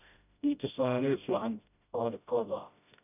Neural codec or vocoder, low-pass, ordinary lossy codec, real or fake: codec, 16 kHz, 0.5 kbps, FreqCodec, smaller model; 3.6 kHz; none; fake